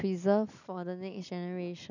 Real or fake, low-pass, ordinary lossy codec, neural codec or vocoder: real; 7.2 kHz; none; none